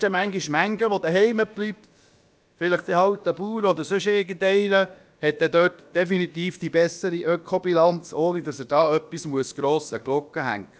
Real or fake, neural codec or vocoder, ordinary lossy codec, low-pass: fake; codec, 16 kHz, about 1 kbps, DyCAST, with the encoder's durations; none; none